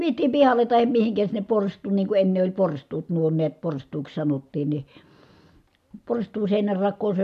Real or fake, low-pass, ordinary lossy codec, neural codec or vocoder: real; 14.4 kHz; MP3, 96 kbps; none